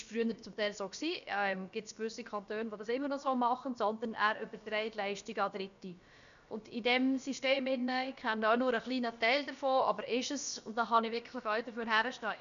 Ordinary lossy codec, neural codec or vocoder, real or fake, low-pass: none; codec, 16 kHz, about 1 kbps, DyCAST, with the encoder's durations; fake; 7.2 kHz